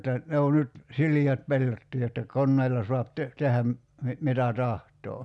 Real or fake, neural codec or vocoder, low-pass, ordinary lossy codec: real; none; none; none